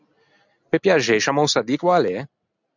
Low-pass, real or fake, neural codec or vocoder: 7.2 kHz; real; none